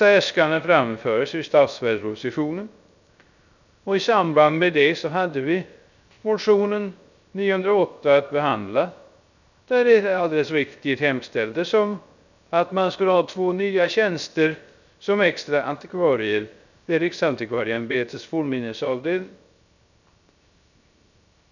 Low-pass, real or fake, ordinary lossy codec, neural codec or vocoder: 7.2 kHz; fake; none; codec, 16 kHz, 0.3 kbps, FocalCodec